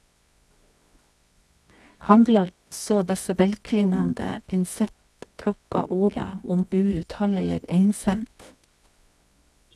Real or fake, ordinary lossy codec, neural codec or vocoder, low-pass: fake; none; codec, 24 kHz, 0.9 kbps, WavTokenizer, medium music audio release; none